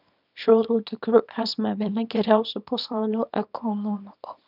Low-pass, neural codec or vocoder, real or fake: 5.4 kHz; codec, 24 kHz, 0.9 kbps, WavTokenizer, small release; fake